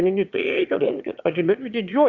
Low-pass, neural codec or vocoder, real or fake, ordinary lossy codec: 7.2 kHz; autoencoder, 22.05 kHz, a latent of 192 numbers a frame, VITS, trained on one speaker; fake; Opus, 64 kbps